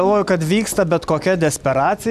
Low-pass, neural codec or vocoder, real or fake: 14.4 kHz; none; real